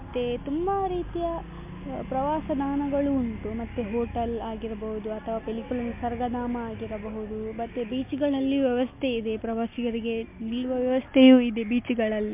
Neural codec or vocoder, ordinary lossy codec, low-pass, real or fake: none; MP3, 32 kbps; 3.6 kHz; real